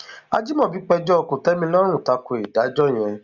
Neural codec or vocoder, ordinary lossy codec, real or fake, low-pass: none; Opus, 64 kbps; real; 7.2 kHz